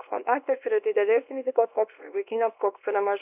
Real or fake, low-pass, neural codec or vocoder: fake; 3.6 kHz; codec, 24 kHz, 0.9 kbps, WavTokenizer, small release